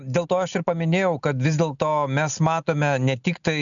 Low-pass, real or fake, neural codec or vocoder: 7.2 kHz; real; none